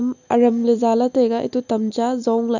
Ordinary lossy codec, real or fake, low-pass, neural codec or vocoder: none; real; 7.2 kHz; none